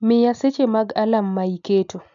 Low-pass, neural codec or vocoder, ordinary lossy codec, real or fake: 7.2 kHz; none; none; real